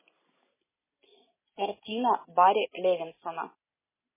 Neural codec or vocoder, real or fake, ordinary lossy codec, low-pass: none; real; MP3, 16 kbps; 3.6 kHz